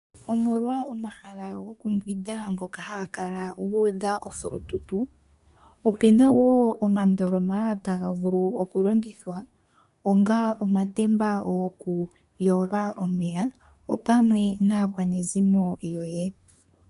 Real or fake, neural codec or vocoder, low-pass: fake; codec, 24 kHz, 1 kbps, SNAC; 10.8 kHz